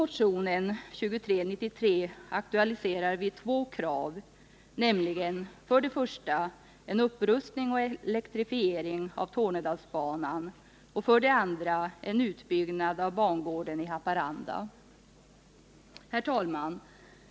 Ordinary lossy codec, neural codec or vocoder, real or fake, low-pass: none; none; real; none